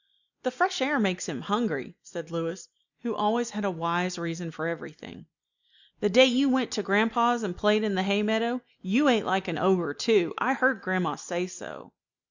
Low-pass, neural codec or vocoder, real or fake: 7.2 kHz; none; real